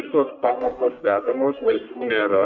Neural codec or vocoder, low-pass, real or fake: codec, 44.1 kHz, 1.7 kbps, Pupu-Codec; 7.2 kHz; fake